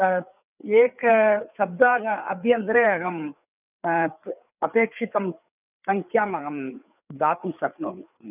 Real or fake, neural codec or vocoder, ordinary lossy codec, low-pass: fake; codec, 16 kHz, 4 kbps, FreqCodec, larger model; none; 3.6 kHz